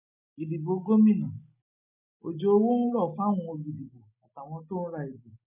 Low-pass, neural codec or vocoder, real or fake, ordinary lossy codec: 3.6 kHz; none; real; none